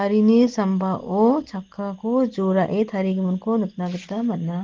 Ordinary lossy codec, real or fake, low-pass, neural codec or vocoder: Opus, 16 kbps; real; 7.2 kHz; none